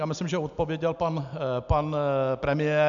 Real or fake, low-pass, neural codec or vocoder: real; 7.2 kHz; none